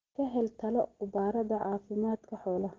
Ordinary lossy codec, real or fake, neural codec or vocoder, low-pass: Opus, 16 kbps; real; none; 7.2 kHz